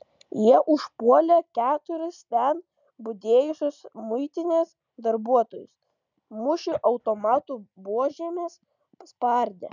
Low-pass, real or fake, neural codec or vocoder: 7.2 kHz; real; none